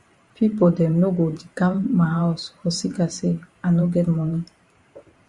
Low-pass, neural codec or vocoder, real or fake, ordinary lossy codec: 10.8 kHz; vocoder, 44.1 kHz, 128 mel bands every 512 samples, BigVGAN v2; fake; Opus, 64 kbps